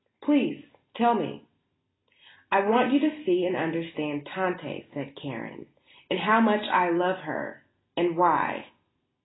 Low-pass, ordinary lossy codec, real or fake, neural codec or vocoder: 7.2 kHz; AAC, 16 kbps; real; none